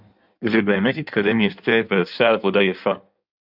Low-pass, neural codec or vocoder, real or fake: 5.4 kHz; codec, 16 kHz in and 24 kHz out, 1.1 kbps, FireRedTTS-2 codec; fake